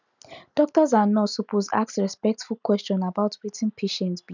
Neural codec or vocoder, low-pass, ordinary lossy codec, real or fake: none; 7.2 kHz; none; real